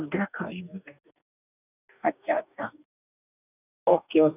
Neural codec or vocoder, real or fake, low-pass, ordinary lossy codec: codec, 44.1 kHz, 2.6 kbps, DAC; fake; 3.6 kHz; none